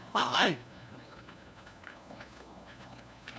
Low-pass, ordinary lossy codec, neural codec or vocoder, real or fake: none; none; codec, 16 kHz, 1 kbps, FunCodec, trained on LibriTTS, 50 frames a second; fake